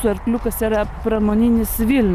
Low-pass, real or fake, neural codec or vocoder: 14.4 kHz; real; none